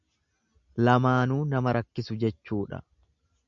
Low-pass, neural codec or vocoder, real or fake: 7.2 kHz; none; real